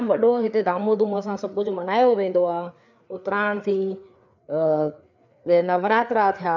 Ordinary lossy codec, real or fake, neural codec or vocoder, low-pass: none; fake; codec, 16 kHz, 4 kbps, FreqCodec, larger model; 7.2 kHz